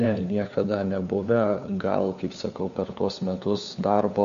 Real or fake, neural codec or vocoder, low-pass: fake; codec, 16 kHz, 4 kbps, FunCodec, trained on LibriTTS, 50 frames a second; 7.2 kHz